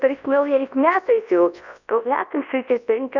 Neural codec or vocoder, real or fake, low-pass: codec, 24 kHz, 0.9 kbps, WavTokenizer, large speech release; fake; 7.2 kHz